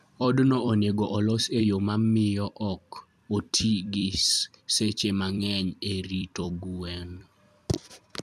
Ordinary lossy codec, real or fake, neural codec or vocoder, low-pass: none; fake; vocoder, 44.1 kHz, 128 mel bands every 256 samples, BigVGAN v2; 14.4 kHz